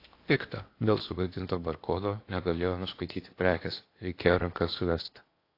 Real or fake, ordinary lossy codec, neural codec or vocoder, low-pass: fake; AAC, 32 kbps; codec, 16 kHz in and 24 kHz out, 0.8 kbps, FocalCodec, streaming, 65536 codes; 5.4 kHz